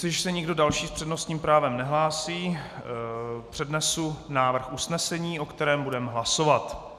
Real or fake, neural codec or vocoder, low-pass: real; none; 14.4 kHz